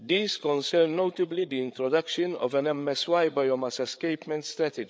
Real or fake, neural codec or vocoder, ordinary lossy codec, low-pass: fake; codec, 16 kHz, 8 kbps, FreqCodec, larger model; none; none